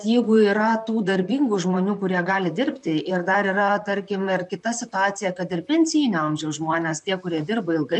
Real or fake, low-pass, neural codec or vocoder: fake; 10.8 kHz; vocoder, 44.1 kHz, 128 mel bands, Pupu-Vocoder